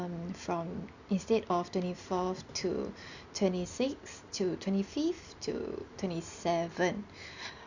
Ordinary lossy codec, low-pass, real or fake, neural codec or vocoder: Opus, 64 kbps; 7.2 kHz; real; none